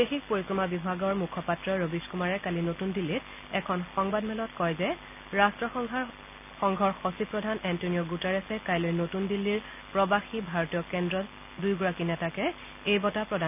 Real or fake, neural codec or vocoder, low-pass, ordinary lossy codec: real; none; 3.6 kHz; none